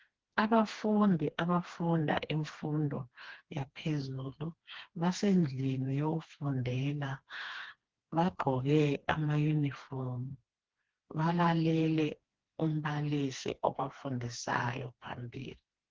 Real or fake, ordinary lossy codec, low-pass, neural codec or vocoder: fake; Opus, 16 kbps; 7.2 kHz; codec, 16 kHz, 2 kbps, FreqCodec, smaller model